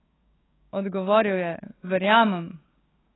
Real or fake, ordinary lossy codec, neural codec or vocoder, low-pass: fake; AAC, 16 kbps; codec, 16 kHz, 6 kbps, DAC; 7.2 kHz